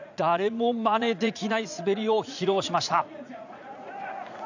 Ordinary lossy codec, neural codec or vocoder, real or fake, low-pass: none; vocoder, 44.1 kHz, 80 mel bands, Vocos; fake; 7.2 kHz